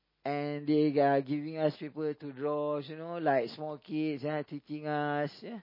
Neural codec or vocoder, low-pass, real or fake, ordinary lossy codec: none; 5.4 kHz; real; MP3, 24 kbps